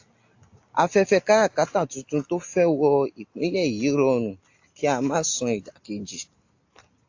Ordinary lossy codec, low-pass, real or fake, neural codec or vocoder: AAC, 48 kbps; 7.2 kHz; real; none